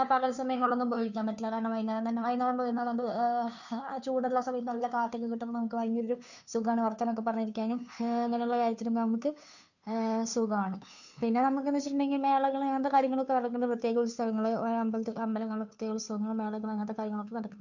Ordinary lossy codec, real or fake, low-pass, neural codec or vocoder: none; fake; 7.2 kHz; codec, 16 kHz, 2 kbps, FunCodec, trained on Chinese and English, 25 frames a second